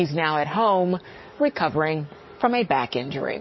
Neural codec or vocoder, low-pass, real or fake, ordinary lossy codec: codec, 44.1 kHz, 7.8 kbps, DAC; 7.2 kHz; fake; MP3, 24 kbps